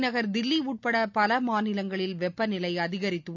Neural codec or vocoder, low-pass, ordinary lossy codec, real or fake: none; 7.2 kHz; none; real